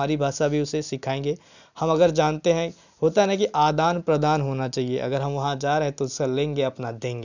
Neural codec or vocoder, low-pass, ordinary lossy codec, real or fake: none; 7.2 kHz; none; real